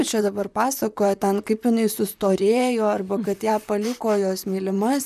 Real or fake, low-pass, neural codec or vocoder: fake; 14.4 kHz; vocoder, 44.1 kHz, 128 mel bands, Pupu-Vocoder